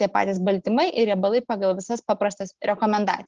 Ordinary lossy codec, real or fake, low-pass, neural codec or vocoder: Opus, 16 kbps; real; 7.2 kHz; none